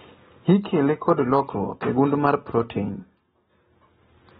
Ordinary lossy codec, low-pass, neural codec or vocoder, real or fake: AAC, 16 kbps; 7.2 kHz; none; real